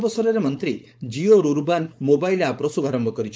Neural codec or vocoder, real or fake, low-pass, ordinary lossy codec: codec, 16 kHz, 4.8 kbps, FACodec; fake; none; none